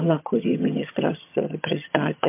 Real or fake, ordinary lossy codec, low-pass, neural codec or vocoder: fake; MP3, 32 kbps; 3.6 kHz; vocoder, 22.05 kHz, 80 mel bands, HiFi-GAN